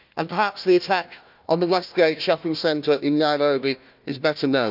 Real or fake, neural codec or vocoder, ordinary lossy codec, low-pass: fake; codec, 16 kHz, 1 kbps, FunCodec, trained on Chinese and English, 50 frames a second; none; 5.4 kHz